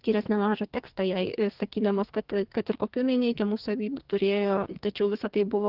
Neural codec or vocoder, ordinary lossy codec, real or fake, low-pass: codec, 44.1 kHz, 2.6 kbps, SNAC; Opus, 16 kbps; fake; 5.4 kHz